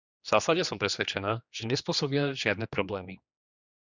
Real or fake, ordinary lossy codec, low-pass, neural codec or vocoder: fake; Opus, 64 kbps; 7.2 kHz; codec, 16 kHz, 2 kbps, X-Codec, HuBERT features, trained on general audio